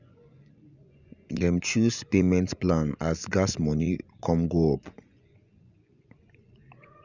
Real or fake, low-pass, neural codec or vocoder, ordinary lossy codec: fake; 7.2 kHz; vocoder, 24 kHz, 100 mel bands, Vocos; none